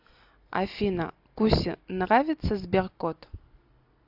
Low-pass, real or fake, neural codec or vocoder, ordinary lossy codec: 5.4 kHz; real; none; AAC, 48 kbps